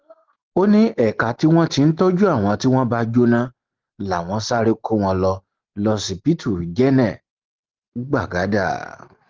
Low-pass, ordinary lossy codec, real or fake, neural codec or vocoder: 7.2 kHz; Opus, 16 kbps; real; none